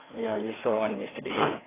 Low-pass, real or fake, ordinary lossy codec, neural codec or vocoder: 3.6 kHz; fake; AAC, 16 kbps; codec, 16 kHz, 2 kbps, FreqCodec, larger model